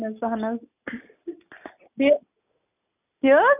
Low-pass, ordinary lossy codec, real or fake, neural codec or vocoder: 3.6 kHz; none; real; none